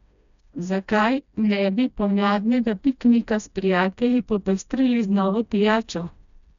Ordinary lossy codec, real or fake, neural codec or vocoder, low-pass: none; fake; codec, 16 kHz, 1 kbps, FreqCodec, smaller model; 7.2 kHz